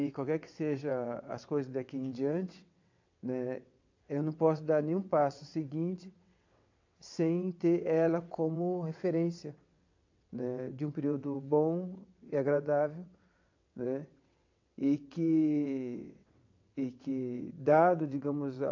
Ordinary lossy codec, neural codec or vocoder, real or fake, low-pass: AAC, 48 kbps; vocoder, 22.05 kHz, 80 mel bands, WaveNeXt; fake; 7.2 kHz